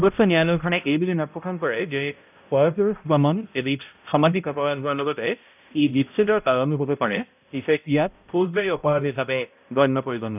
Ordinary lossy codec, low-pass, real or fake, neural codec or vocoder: none; 3.6 kHz; fake; codec, 16 kHz, 0.5 kbps, X-Codec, HuBERT features, trained on balanced general audio